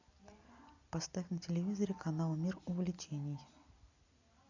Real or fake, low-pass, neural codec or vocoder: real; 7.2 kHz; none